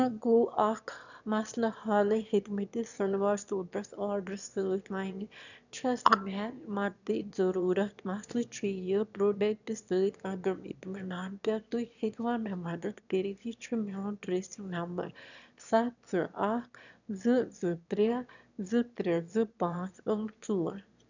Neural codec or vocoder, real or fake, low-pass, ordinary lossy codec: autoencoder, 22.05 kHz, a latent of 192 numbers a frame, VITS, trained on one speaker; fake; 7.2 kHz; none